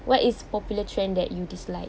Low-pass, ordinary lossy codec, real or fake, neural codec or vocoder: none; none; real; none